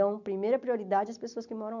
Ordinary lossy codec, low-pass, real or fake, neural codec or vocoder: none; 7.2 kHz; real; none